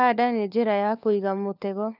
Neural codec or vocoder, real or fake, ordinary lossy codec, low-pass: codec, 16 kHz, 4 kbps, FunCodec, trained on LibriTTS, 50 frames a second; fake; none; 5.4 kHz